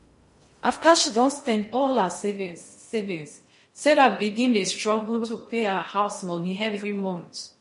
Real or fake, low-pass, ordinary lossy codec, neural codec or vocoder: fake; 10.8 kHz; MP3, 48 kbps; codec, 16 kHz in and 24 kHz out, 0.6 kbps, FocalCodec, streaming, 2048 codes